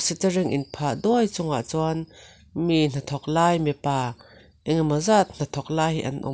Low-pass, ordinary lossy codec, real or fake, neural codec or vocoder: none; none; real; none